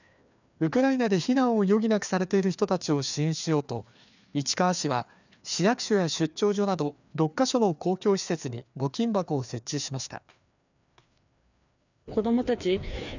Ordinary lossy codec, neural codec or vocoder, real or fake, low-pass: none; codec, 16 kHz, 2 kbps, FreqCodec, larger model; fake; 7.2 kHz